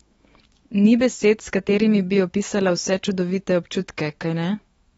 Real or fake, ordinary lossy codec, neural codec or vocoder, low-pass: fake; AAC, 24 kbps; autoencoder, 48 kHz, 128 numbers a frame, DAC-VAE, trained on Japanese speech; 19.8 kHz